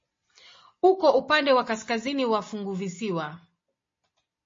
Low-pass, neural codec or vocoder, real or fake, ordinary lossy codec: 7.2 kHz; none; real; MP3, 32 kbps